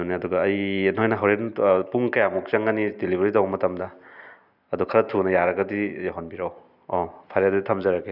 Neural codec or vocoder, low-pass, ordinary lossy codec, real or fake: none; 5.4 kHz; none; real